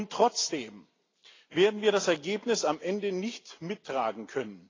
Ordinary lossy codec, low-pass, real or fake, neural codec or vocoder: AAC, 32 kbps; 7.2 kHz; real; none